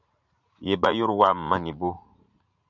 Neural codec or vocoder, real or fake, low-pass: vocoder, 44.1 kHz, 80 mel bands, Vocos; fake; 7.2 kHz